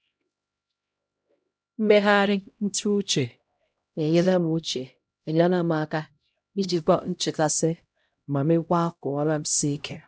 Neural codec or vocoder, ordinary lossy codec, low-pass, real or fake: codec, 16 kHz, 0.5 kbps, X-Codec, HuBERT features, trained on LibriSpeech; none; none; fake